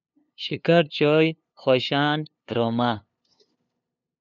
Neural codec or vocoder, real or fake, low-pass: codec, 16 kHz, 2 kbps, FunCodec, trained on LibriTTS, 25 frames a second; fake; 7.2 kHz